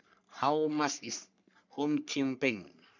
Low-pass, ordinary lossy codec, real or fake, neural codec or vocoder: 7.2 kHz; none; fake; codec, 44.1 kHz, 3.4 kbps, Pupu-Codec